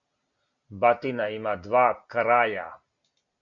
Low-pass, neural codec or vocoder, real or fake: 7.2 kHz; none; real